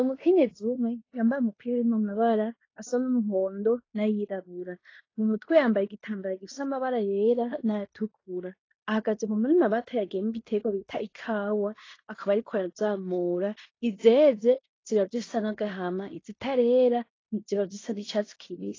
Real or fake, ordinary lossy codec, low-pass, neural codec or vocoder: fake; AAC, 32 kbps; 7.2 kHz; codec, 16 kHz in and 24 kHz out, 0.9 kbps, LongCat-Audio-Codec, fine tuned four codebook decoder